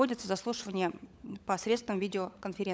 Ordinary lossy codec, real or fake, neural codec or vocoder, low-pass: none; fake; codec, 16 kHz, 16 kbps, FunCodec, trained on LibriTTS, 50 frames a second; none